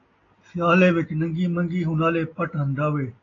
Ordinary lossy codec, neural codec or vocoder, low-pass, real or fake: AAC, 32 kbps; none; 7.2 kHz; real